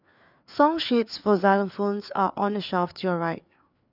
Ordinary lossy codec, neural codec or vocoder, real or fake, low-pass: none; codec, 16 kHz, 4 kbps, FreqCodec, larger model; fake; 5.4 kHz